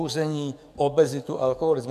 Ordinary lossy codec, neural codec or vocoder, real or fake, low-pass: MP3, 96 kbps; codec, 44.1 kHz, 7.8 kbps, DAC; fake; 14.4 kHz